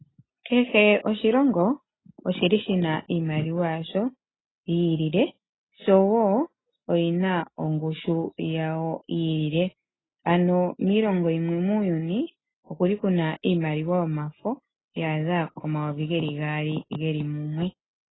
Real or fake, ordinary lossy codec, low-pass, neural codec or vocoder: real; AAC, 16 kbps; 7.2 kHz; none